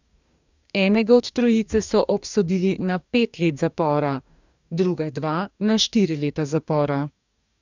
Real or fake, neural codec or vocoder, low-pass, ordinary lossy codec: fake; codec, 44.1 kHz, 2.6 kbps, DAC; 7.2 kHz; none